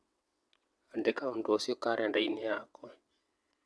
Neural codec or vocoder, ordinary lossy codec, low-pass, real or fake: vocoder, 22.05 kHz, 80 mel bands, WaveNeXt; none; none; fake